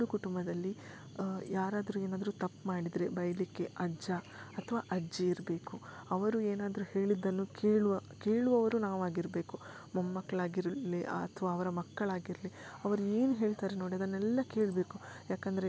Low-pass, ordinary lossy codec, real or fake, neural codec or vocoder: none; none; real; none